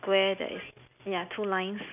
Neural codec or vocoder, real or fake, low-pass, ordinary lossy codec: none; real; 3.6 kHz; none